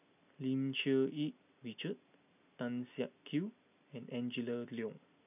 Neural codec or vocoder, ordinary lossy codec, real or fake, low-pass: none; none; real; 3.6 kHz